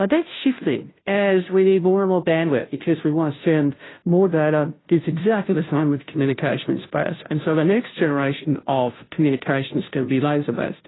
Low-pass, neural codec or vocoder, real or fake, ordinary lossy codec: 7.2 kHz; codec, 16 kHz, 0.5 kbps, FunCodec, trained on Chinese and English, 25 frames a second; fake; AAC, 16 kbps